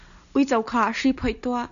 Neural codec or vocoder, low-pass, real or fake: none; 7.2 kHz; real